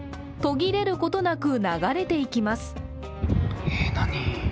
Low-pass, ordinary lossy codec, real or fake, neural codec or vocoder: none; none; real; none